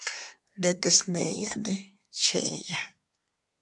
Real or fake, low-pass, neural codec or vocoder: fake; 10.8 kHz; codec, 24 kHz, 1 kbps, SNAC